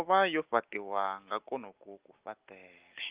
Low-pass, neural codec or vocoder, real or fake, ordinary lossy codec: 3.6 kHz; none; real; Opus, 32 kbps